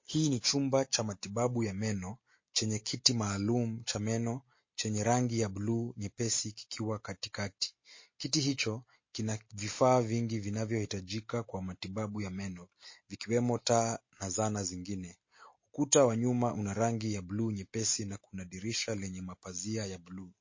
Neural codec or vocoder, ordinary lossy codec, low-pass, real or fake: none; MP3, 32 kbps; 7.2 kHz; real